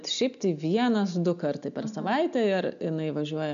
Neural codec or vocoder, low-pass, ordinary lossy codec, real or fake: none; 7.2 kHz; AAC, 96 kbps; real